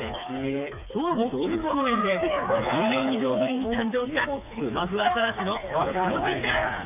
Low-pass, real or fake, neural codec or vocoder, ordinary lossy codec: 3.6 kHz; fake; codec, 16 kHz, 4 kbps, FreqCodec, smaller model; none